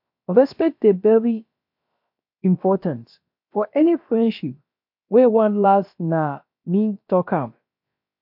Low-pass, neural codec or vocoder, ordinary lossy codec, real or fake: 5.4 kHz; codec, 16 kHz, about 1 kbps, DyCAST, with the encoder's durations; MP3, 48 kbps; fake